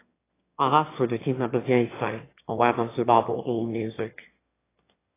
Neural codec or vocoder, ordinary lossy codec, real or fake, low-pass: autoencoder, 22.05 kHz, a latent of 192 numbers a frame, VITS, trained on one speaker; AAC, 16 kbps; fake; 3.6 kHz